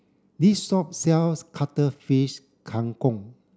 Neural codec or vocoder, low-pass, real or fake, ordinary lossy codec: none; none; real; none